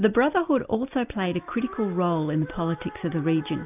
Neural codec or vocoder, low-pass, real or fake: none; 3.6 kHz; real